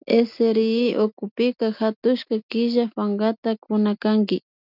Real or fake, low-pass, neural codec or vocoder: real; 5.4 kHz; none